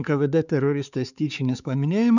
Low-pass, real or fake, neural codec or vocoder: 7.2 kHz; fake; codec, 16 kHz, 4 kbps, X-Codec, HuBERT features, trained on balanced general audio